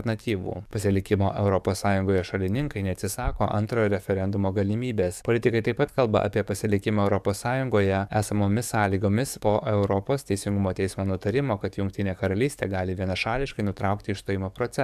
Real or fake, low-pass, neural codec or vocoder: fake; 14.4 kHz; codec, 44.1 kHz, 7.8 kbps, DAC